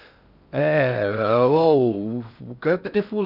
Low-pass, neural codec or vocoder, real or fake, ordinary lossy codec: 5.4 kHz; codec, 16 kHz in and 24 kHz out, 0.6 kbps, FocalCodec, streaming, 2048 codes; fake; none